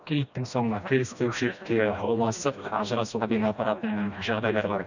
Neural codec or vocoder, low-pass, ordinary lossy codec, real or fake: codec, 16 kHz, 1 kbps, FreqCodec, smaller model; 7.2 kHz; none; fake